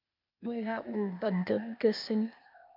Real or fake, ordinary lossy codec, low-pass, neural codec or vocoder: fake; MP3, 48 kbps; 5.4 kHz; codec, 16 kHz, 0.8 kbps, ZipCodec